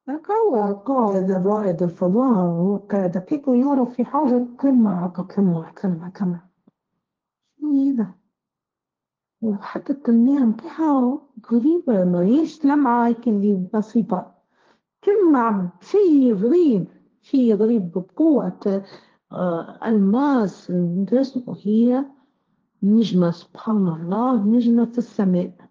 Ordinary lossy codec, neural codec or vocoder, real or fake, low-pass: Opus, 24 kbps; codec, 16 kHz, 1.1 kbps, Voila-Tokenizer; fake; 7.2 kHz